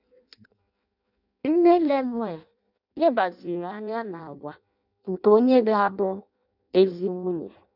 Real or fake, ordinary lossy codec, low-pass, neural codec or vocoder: fake; none; 5.4 kHz; codec, 16 kHz in and 24 kHz out, 0.6 kbps, FireRedTTS-2 codec